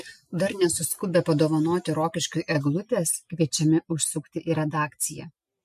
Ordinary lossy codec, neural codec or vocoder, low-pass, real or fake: MP3, 64 kbps; none; 14.4 kHz; real